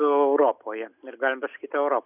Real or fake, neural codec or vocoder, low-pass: real; none; 3.6 kHz